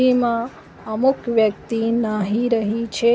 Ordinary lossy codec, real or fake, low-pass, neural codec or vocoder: none; real; none; none